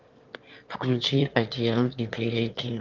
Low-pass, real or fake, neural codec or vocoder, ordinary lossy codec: 7.2 kHz; fake; autoencoder, 22.05 kHz, a latent of 192 numbers a frame, VITS, trained on one speaker; Opus, 32 kbps